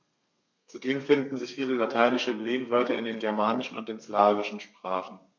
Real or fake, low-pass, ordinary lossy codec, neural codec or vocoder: fake; 7.2 kHz; MP3, 64 kbps; codec, 32 kHz, 1.9 kbps, SNAC